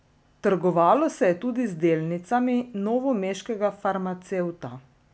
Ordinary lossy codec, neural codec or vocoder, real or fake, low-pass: none; none; real; none